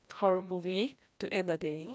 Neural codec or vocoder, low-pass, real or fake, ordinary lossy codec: codec, 16 kHz, 1 kbps, FreqCodec, larger model; none; fake; none